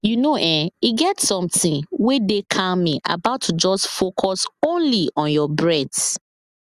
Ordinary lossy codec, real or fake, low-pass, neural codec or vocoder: Opus, 64 kbps; real; 14.4 kHz; none